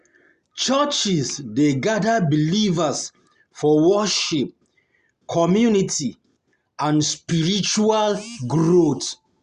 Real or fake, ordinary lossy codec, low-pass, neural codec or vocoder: real; Opus, 64 kbps; 10.8 kHz; none